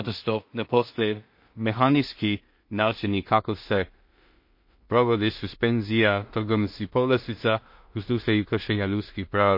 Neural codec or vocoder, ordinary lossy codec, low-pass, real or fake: codec, 16 kHz in and 24 kHz out, 0.4 kbps, LongCat-Audio-Codec, two codebook decoder; MP3, 32 kbps; 5.4 kHz; fake